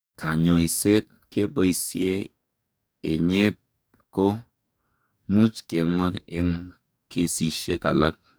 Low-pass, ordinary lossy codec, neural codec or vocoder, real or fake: none; none; codec, 44.1 kHz, 2.6 kbps, DAC; fake